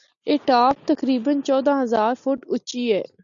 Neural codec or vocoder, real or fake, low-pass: none; real; 7.2 kHz